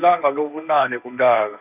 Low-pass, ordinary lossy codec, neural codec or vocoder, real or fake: 3.6 kHz; none; codec, 16 kHz, 1.1 kbps, Voila-Tokenizer; fake